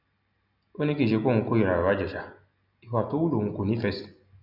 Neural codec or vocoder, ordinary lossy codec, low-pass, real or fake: none; none; 5.4 kHz; real